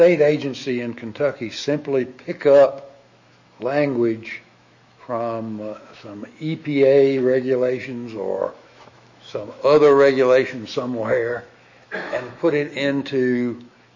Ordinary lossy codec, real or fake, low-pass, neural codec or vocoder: MP3, 32 kbps; fake; 7.2 kHz; autoencoder, 48 kHz, 128 numbers a frame, DAC-VAE, trained on Japanese speech